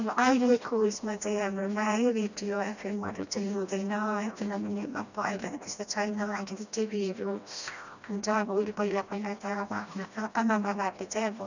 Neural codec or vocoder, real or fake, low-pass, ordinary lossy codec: codec, 16 kHz, 1 kbps, FreqCodec, smaller model; fake; 7.2 kHz; none